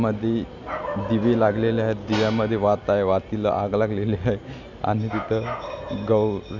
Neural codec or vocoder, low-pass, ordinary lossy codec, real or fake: none; 7.2 kHz; none; real